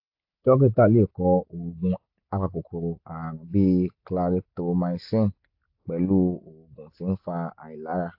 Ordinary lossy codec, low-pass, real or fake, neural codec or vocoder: AAC, 48 kbps; 5.4 kHz; real; none